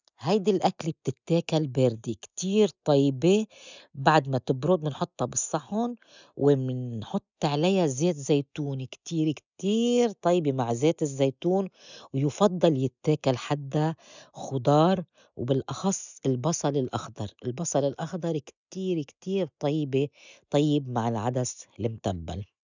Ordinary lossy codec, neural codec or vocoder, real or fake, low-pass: none; none; real; 7.2 kHz